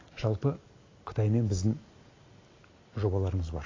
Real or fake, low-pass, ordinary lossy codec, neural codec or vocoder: real; 7.2 kHz; AAC, 32 kbps; none